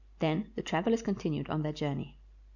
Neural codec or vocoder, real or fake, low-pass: none; real; 7.2 kHz